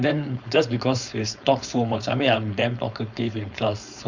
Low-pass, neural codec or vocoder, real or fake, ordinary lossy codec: 7.2 kHz; codec, 16 kHz, 4.8 kbps, FACodec; fake; Opus, 64 kbps